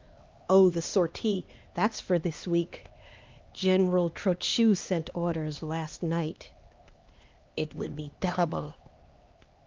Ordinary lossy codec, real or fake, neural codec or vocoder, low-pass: Opus, 32 kbps; fake; codec, 16 kHz, 2 kbps, X-Codec, HuBERT features, trained on LibriSpeech; 7.2 kHz